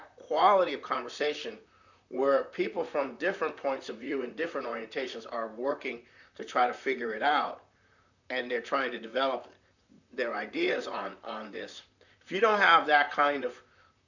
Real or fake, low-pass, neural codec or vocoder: fake; 7.2 kHz; vocoder, 44.1 kHz, 128 mel bands, Pupu-Vocoder